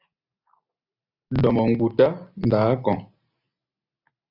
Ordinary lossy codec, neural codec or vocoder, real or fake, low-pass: AAC, 48 kbps; none; real; 5.4 kHz